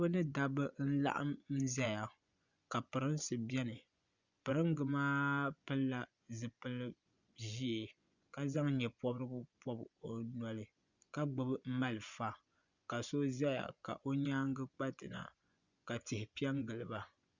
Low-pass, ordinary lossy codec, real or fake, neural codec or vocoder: 7.2 kHz; Opus, 64 kbps; fake; vocoder, 24 kHz, 100 mel bands, Vocos